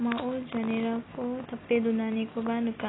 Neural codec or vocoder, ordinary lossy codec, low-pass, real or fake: none; AAC, 16 kbps; 7.2 kHz; real